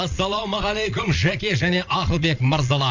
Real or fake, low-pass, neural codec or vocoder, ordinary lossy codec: fake; 7.2 kHz; vocoder, 22.05 kHz, 80 mel bands, Vocos; MP3, 64 kbps